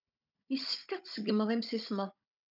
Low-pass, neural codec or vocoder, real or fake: 5.4 kHz; codec, 16 kHz, 16 kbps, FunCodec, trained on Chinese and English, 50 frames a second; fake